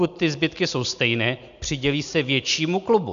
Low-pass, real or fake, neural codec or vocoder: 7.2 kHz; real; none